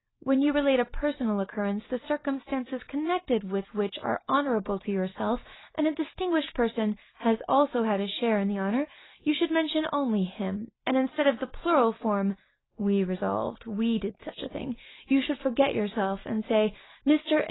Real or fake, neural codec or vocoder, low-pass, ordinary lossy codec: real; none; 7.2 kHz; AAC, 16 kbps